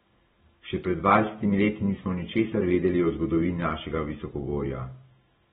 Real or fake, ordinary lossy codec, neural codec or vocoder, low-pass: real; AAC, 16 kbps; none; 19.8 kHz